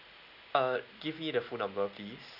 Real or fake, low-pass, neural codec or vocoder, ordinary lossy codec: real; 5.4 kHz; none; none